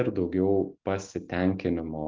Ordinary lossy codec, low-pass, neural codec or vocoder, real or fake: Opus, 24 kbps; 7.2 kHz; none; real